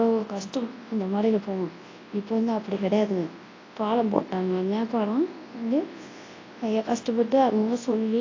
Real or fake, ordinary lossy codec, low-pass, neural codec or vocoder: fake; AAC, 32 kbps; 7.2 kHz; codec, 24 kHz, 0.9 kbps, WavTokenizer, large speech release